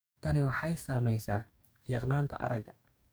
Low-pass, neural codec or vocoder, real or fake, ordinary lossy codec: none; codec, 44.1 kHz, 2.6 kbps, DAC; fake; none